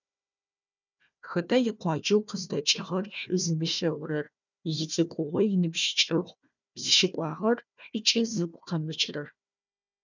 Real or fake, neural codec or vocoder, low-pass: fake; codec, 16 kHz, 1 kbps, FunCodec, trained on Chinese and English, 50 frames a second; 7.2 kHz